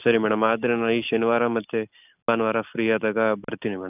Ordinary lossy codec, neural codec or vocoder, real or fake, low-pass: none; none; real; 3.6 kHz